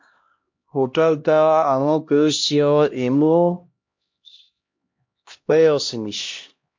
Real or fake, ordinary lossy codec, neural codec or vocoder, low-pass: fake; MP3, 48 kbps; codec, 16 kHz, 1 kbps, X-Codec, HuBERT features, trained on LibriSpeech; 7.2 kHz